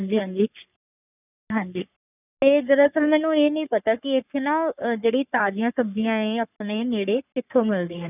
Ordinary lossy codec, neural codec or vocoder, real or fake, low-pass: none; codec, 44.1 kHz, 3.4 kbps, Pupu-Codec; fake; 3.6 kHz